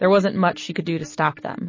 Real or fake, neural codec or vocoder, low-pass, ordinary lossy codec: real; none; 7.2 kHz; MP3, 32 kbps